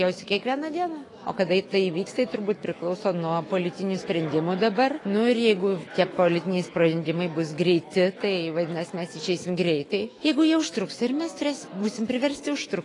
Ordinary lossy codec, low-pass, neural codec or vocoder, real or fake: AAC, 32 kbps; 10.8 kHz; autoencoder, 48 kHz, 128 numbers a frame, DAC-VAE, trained on Japanese speech; fake